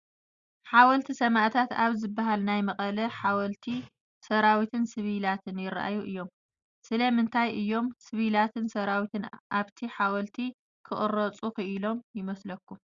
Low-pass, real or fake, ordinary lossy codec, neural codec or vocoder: 7.2 kHz; real; Opus, 64 kbps; none